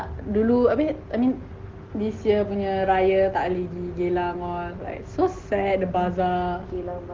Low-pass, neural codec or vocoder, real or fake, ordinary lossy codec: 7.2 kHz; none; real; Opus, 16 kbps